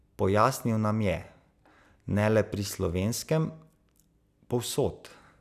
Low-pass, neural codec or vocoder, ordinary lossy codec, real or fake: 14.4 kHz; none; none; real